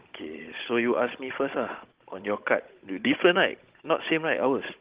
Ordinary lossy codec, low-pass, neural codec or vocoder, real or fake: Opus, 16 kbps; 3.6 kHz; codec, 16 kHz, 16 kbps, FunCodec, trained on Chinese and English, 50 frames a second; fake